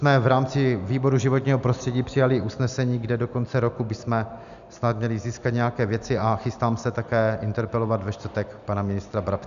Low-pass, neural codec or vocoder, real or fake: 7.2 kHz; none; real